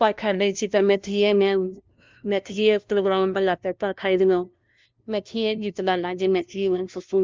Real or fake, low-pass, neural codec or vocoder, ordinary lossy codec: fake; 7.2 kHz; codec, 16 kHz, 0.5 kbps, FunCodec, trained on LibriTTS, 25 frames a second; Opus, 24 kbps